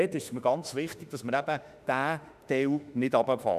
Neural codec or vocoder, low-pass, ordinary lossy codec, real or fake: autoencoder, 48 kHz, 32 numbers a frame, DAC-VAE, trained on Japanese speech; 14.4 kHz; none; fake